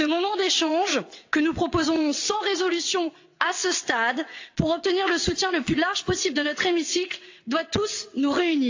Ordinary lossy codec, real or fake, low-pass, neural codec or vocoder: AAC, 48 kbps; fake; 7.2 kHz; vocoder, 22.05 kHz, 80 mel bands, WaveNeXt